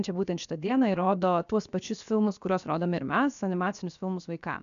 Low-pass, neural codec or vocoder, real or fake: 7.2 kHz; codec, 16 kHz, about 1 kbps, DyCAST, with the encoder's durations; fake